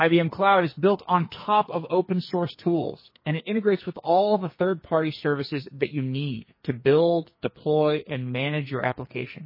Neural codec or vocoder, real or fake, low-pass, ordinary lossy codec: codec, 44.1 kHz, 2.6 kbps, SNAC; fake; 5.4 kHz; MP3, 24 kbps